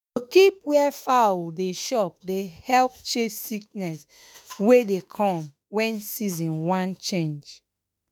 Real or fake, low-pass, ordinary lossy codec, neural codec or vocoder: fake; none; none; autoencoder, 48 kHz, 32 numbers a frame, DAC-VAE, trained on Japanese speech